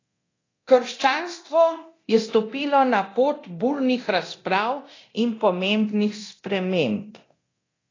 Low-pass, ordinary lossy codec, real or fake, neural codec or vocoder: 7.2 kHz; AAC, 32 kbps; fake; codec, 24 kHz, 0.9 kbps, DualCodec